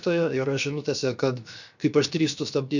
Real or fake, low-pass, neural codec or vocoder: fake; 7.2 kHz; codec, 16 kHz, 0.7 kbps, FocalCodec